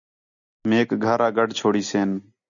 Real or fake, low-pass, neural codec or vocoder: real; 7.2 kHz; none